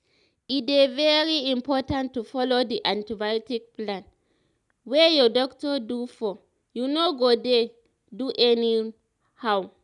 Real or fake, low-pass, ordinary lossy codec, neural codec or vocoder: real; 10.8 kHz; none; none